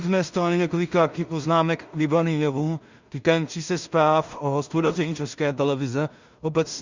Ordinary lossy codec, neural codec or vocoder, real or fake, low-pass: Opus, 64 kbps; codec, 16 kHz in and 24 kHz out, 0.4 kbps, LongCat-Audio-Codec, two codebook decoder; fake; 7.2 kHz